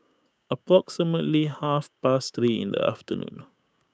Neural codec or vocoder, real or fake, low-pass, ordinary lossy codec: codec, 16 kHz, 6 kbps, DAC; fake; none; none